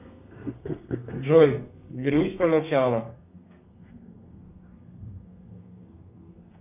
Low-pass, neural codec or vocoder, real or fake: 3.6 kHz; codec, 32 kHz, 1.9 kbps, SNAC; fake